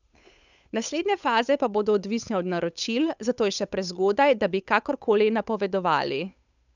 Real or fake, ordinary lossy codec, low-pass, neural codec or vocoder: fake; none; 7.2 kHz; codec, 16 kHz, 8 kbps, FunCodec, trained on Chinese and English, 25 frames a second